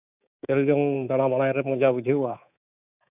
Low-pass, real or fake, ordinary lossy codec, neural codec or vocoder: 3.6 kHz; fake; none; codec, 24 kHz, 3.1 kbps, DualCodec